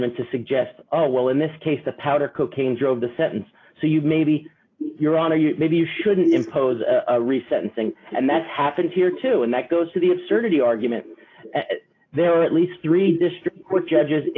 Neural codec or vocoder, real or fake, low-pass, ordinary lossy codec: none; real; 7.2 kHz; AAC, 32 kbps